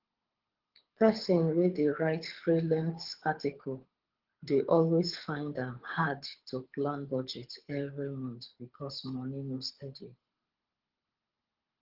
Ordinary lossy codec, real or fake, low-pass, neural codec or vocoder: Opus, 16 kbps; fake; 5.4 kHz; codec, 24 kHz, 6 kbps, HILCodec